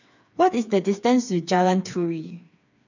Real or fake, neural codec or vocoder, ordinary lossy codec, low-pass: fake; codec, 16 kHz, 4 kbps, FreqCodec, smaller model; none; 7.2 kHz